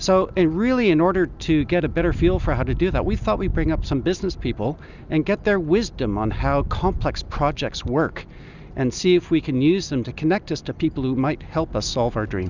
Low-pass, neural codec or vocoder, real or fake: 7.2 kHz; none; real